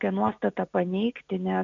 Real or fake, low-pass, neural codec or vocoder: real; 7.2 kHz; none